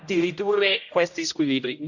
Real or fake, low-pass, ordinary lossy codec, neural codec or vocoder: fake; 7.2 kHz; none; codec, 16 kHz, 0.5 kbps, X-Codec, HuBERT features, trained on general audio